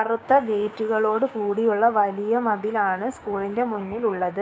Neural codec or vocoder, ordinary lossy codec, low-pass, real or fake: codec, 16 kHz, 6 kbps, DAC; none; none; fake